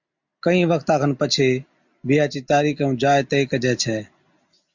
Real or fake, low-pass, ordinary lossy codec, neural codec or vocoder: real; 7.2 kHz; MP3, 64 kbps; none